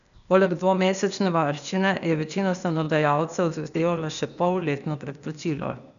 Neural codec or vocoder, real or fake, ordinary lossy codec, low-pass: codec, 16 kHz, 0.8 kbps, ZipCodec; fake; none; 7.2 kHz